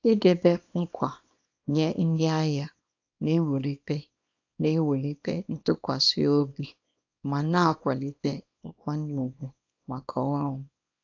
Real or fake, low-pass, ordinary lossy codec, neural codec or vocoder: fake; 7.2 kHz; AAC, 48 kbps; codec, 24 kHz, 0.9 kbps, WavTokenizer, small release